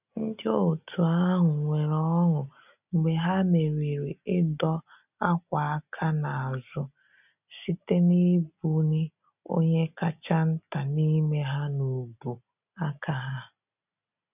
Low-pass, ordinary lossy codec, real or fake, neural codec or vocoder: 3.6 kHz; none; real; none